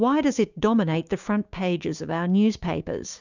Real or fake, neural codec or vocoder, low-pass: fake; codec, 16 kHz, 6 kbps, DAC; 7.2 kHz